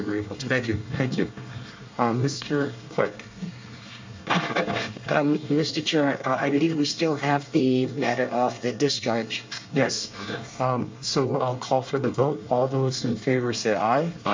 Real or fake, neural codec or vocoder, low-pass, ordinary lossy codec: fake; codec, 24 kHz, 1 kbps, SNAC; 7.2 kHz; MP3, 64 kbps